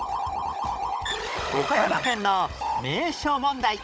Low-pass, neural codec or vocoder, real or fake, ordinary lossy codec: none; codec, 16 kHz, 16 kbps, FunCodec, trained on Chinese and English, 50 frames a second; fake; none